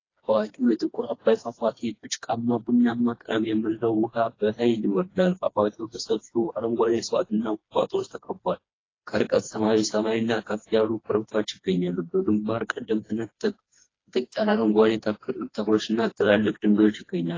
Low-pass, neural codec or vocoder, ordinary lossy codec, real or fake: 7.2 kHz; codec, 16 kHz, 2 kbps, FreqCodec, smaller model; AAC, 32 kbps; fake